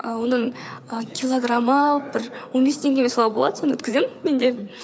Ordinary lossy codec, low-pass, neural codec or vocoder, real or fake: none; none; codec, 16 kHz, 4 kbps, FreqCodec, larger model; fake